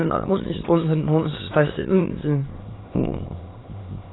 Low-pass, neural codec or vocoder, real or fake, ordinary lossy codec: 7.2 kHz; autoencoder, 22.05 kHz, a latent of 192 numbers a frame, VITS, trained on many speakers; fake; AAC, 16 kbps